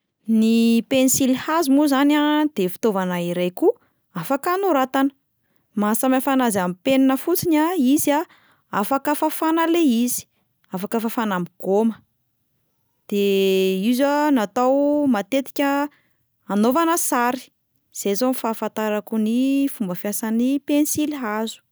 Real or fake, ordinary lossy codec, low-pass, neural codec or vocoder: real; none; none; none